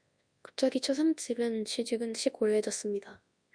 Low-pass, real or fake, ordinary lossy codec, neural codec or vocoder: 9.9 kHz; fake; Opus, 64 kbps; codec, 24 kHz, 0.9 kbps, WavTokenizer, large speech release